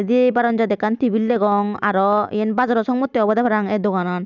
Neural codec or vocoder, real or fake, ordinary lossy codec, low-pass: none; real; none; 7.2 kHz